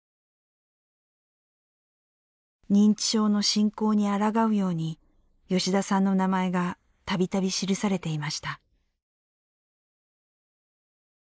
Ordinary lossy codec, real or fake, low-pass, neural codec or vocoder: none; real; none; none